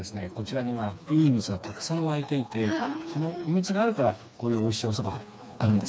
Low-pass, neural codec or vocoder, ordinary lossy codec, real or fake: none; codec, 16 kHz, 2 kbps, FreqCodec, smaller model; none; fake